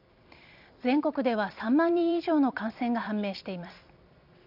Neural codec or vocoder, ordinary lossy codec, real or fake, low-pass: vocoder, 44.1 kHz, 128 mel bands every 256 samples, BigVGAN v2; none; fake; 5.4 kHz